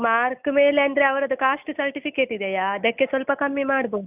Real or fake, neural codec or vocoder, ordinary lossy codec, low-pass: real; none; none; 3.6 kHz